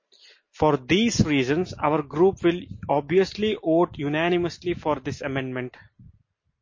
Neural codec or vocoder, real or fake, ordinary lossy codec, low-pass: none; real; MP3, 32 kbps; 7.2 kHz